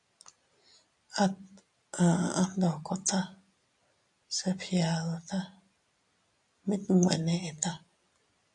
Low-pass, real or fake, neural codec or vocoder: 10.8 kHz; real; none